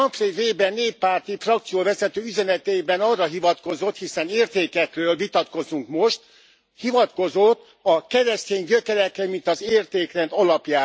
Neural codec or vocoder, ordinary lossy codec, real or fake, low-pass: none; none; real; none